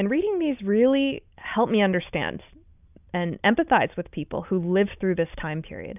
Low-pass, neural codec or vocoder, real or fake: 3.6 kHz; none; real